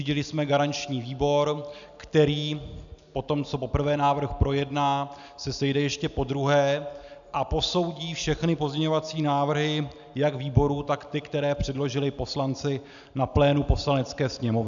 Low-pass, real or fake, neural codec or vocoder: 7.2 kHz; real; none